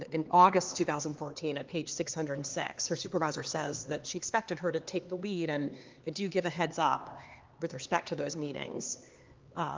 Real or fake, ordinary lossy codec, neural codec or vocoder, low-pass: fake; Opus, 32 kbps; codec, 16 kHz, 2 kbps, X-Codec, HuBERT features, trained on LibriSpeech; 7.2 kHz